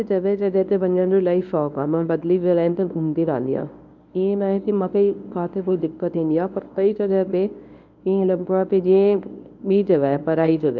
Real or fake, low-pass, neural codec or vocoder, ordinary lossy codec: fake; 7.2 kHz; codec, 24 kHz, 0.9 kbps, WavTokenizer, medium speech release version 1; none